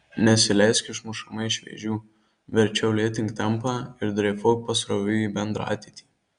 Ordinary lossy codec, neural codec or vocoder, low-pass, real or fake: Opus, 64 kbps; none; 9.9 kHz; real